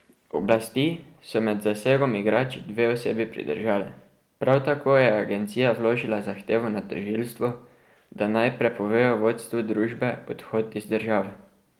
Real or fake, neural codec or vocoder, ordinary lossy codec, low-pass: real; none; Opus, 24 kbps; 19.8 kHz